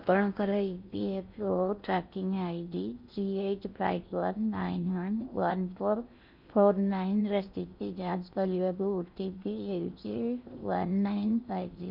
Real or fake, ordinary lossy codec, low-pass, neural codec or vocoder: fake; none; 5.4 kHz; codec, 16 kHz in and 24 kHz out, 0.6 kbps, FocalCodec, streaming, 4096 codes